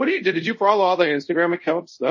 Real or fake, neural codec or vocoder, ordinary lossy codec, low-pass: fake; codec, 24 kHz, 0.5 kbps, DualCodec; MP3, 32 kbps; 7.2 kHz